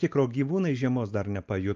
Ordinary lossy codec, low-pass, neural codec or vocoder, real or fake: Opus, 24 kbps; 7.2 kHz; none; real